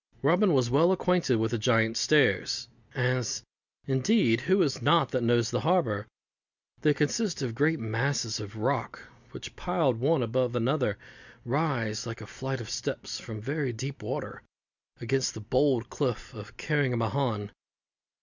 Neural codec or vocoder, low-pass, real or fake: none; 7.2 kHz; real